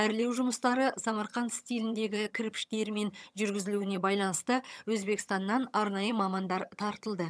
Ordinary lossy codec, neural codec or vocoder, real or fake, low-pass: none; vocoder, 22.05 kHz, 80 mel bands, HiFi-GAN; fake; none